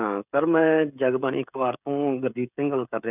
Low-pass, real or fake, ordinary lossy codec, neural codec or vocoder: 3.6 kHz; fake; none; codec, 16 kHz, 16 kbps, FreqCodec, smaller model